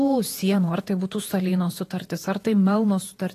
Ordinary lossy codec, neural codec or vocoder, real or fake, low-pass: AAC, 48 kbps; vocoder, 48 kHz, 128 mel bands, Vocos; fake; 14.4 kHz